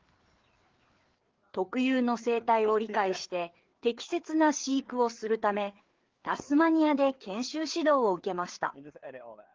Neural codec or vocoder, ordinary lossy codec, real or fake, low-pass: codec, 16 kHz, 4 kbps, FreqCodec, larger model; Opus, 16 kbps; fake; 7.2 kHz